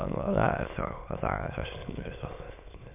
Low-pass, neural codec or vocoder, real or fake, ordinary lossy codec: 3.6 kHz; autoencoder, 22.05 kHz, a latent of 192 numbers a frame, VITS, trained on many speakers; fake; MP3, 32 kbps